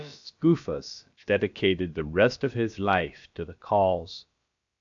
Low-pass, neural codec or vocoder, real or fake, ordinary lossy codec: 7.2 kHz; codec, 16 kHz, about 1 kbps, DyCAST, with the encoder's durations; fake; AAC, 64 kbps